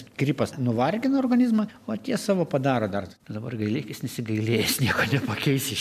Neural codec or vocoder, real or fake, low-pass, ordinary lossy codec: none; real; 14.4 kHz; MP3, 96 kbps